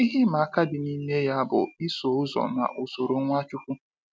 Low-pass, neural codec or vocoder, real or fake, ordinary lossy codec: none; none; real; none